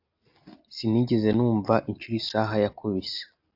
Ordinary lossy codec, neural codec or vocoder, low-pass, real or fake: AAC, 48 kbps; none; 5.4 kHz; real